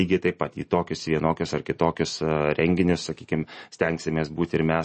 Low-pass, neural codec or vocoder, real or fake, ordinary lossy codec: 10.8 kHz; none; real; MP3, 32 kbps